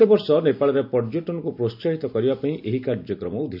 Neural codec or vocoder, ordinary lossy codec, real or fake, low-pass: none; none; real; 5.4 kHz